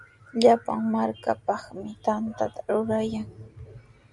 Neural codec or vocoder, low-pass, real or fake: none; 10.8 kHz; real